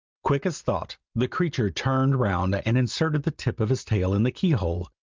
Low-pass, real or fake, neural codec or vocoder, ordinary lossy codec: 7.2 kHz; real; none; Opus, 24 kbps